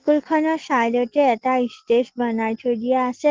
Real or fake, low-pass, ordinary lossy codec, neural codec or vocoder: real; 7.2 kHz; Opus, 16 kbps; none